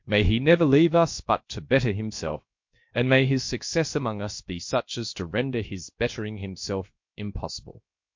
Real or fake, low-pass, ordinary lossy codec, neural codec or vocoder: fake; 7.2 kHz; MP3, 48 kbps; codec, 16 kHz, about 1 kbps, DyCAST, with the encoder's durations